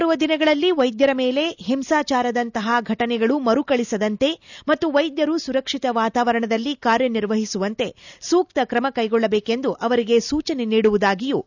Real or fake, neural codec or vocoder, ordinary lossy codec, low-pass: real; none; none; 7.2 kHz